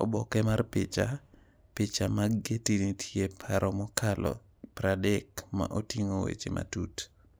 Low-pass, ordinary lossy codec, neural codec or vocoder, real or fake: none; none; none; real